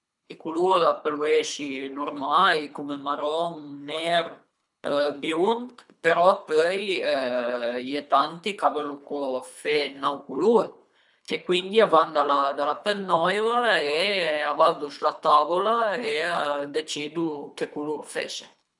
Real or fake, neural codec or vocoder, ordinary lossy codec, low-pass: fake; codec, 24 kHz, 3 kbps, HILCodec; none; 10.8 kHz